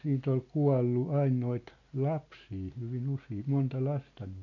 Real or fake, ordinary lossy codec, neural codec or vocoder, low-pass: real; none; none; 7.2 kHz